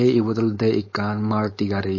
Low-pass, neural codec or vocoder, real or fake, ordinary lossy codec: 7.2 kHz; codec, 16 kHz, 4.8 kbps, FACodec; fake; MP3, 32 kbps